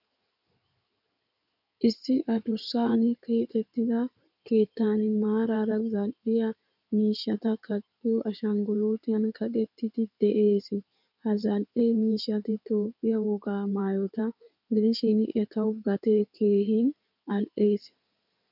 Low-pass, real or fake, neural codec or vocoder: 5.4 kHz; fake; codec, 16 kHz in and 24 kHz out, 2.2 kbps, FireRedTTS-2 codec